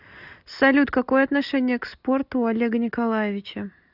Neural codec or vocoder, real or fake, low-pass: none; real; 5.4 kHz